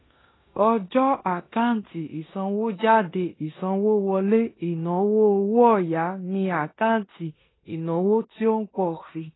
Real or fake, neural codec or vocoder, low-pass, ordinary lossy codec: fake; codec, 16 kHz in and 24 kHz out, 0.9 kbps, LongCat-Audio-Codec, four codebook decoder; 7.2 kHz; AAC, 16 kbps